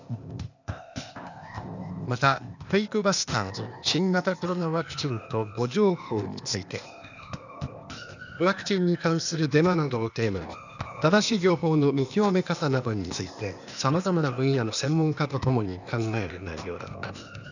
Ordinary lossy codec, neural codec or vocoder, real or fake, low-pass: none; codec, 16 kHz, 0.8 kbps, ZipCodec; fake; 7.2 kHz